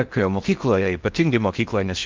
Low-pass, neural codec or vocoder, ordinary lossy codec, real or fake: 7.2 kHz; codec, 16 kHz in and 24 kHz out, 0.6 kbps, FocalCodec, streaming, 4096 codes; Opus, 32 kbps; fake